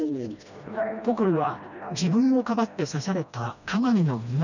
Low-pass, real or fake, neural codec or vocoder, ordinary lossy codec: 7.2 kHz; fake; codec, 16 kHz, 1 kbps, FreqCodec, smaller model; none